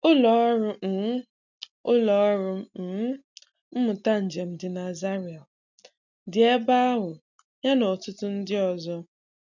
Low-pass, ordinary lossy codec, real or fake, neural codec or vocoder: 7.2 kHz; none; real; none